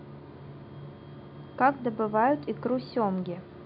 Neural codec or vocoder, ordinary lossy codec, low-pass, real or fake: none; none; 5.4 kHz; real